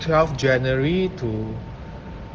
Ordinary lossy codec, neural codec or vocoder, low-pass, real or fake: Opus, 16 kbps; none; 7.2 kHz; real